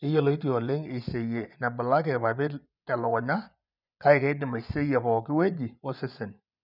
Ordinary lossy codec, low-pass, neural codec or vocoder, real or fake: none; 5.4 kHz; vocoder, 24 kHz, 100 mel bands, Vocos; fake